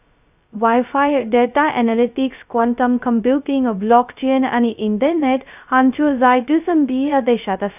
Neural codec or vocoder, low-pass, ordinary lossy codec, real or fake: codec, 16 kHz, 0.2 kbps, FocalCodec; 3.6 kHz; none; fake